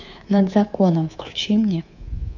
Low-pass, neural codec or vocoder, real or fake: 7.2 kHz; codec, 24 kHz, 3.1 kbps, DualCodec; fake